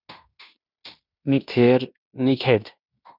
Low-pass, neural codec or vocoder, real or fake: 5.4 kHz; codec, 16 kHz in and 24 kHz out, 0.9 kbps, LongCat-Audio-Codec, fine tuned four codebook decoder; fake